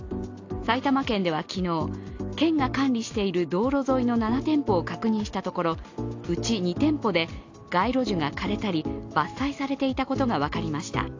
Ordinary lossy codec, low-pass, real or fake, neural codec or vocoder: AAC, 48 kbps; 7.2 kHz; real; none